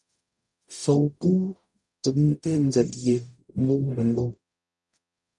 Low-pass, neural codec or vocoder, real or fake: 10.8 kHz; codec, 44.1 kHz, 0.9 kbps, DAC; fake